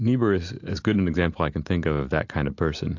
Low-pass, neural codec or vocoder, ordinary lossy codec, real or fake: 7.2 kHz; none; AAC, 48 kbps; real